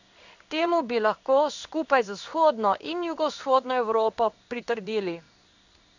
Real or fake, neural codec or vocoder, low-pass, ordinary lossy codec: fake; codec, 16 kHz in and 24 kHz out, 1 kbps, XY-Tokenizer; 7.2 kHz; none